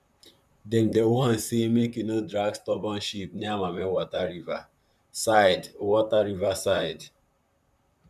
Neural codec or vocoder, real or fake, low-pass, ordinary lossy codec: vocoder, 44.1 kHz, 128 mel bands, Pupu-Vocoder; fake; 14.4 kHz; none